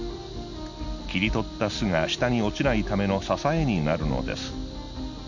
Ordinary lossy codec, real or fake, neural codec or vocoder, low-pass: none; real; none; 7.2 kHz